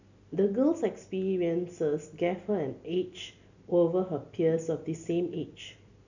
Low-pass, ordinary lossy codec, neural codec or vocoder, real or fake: 7.2 kHz; none; none; real